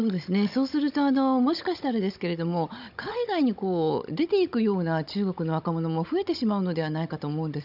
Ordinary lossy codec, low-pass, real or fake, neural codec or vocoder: none; 5.4 kHz; fake; codec, 16 kHz, 16 kbps, FunCodec, trained on Chinese and English, 50 frames a second